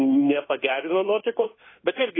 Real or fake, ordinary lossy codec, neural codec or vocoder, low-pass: fake; AAC, 16 kbps; codec, 24 kHz, 1.2 kbps, DualCodec; 7.2 kHz